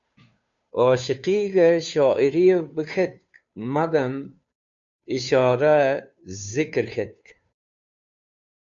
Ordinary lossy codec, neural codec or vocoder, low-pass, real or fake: MP3, 64 kbps; codec, 16 kHz, 2 kbps, FunCodec, trained on Chinese and English, 25 frames a second; 7.2 kHz; fake